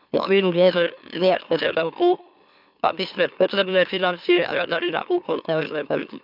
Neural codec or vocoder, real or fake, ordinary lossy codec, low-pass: autoencoder, 44.1 kHz, a latent of 192 numbers a frame, MeloTTS; fake; none; 5.4 kHz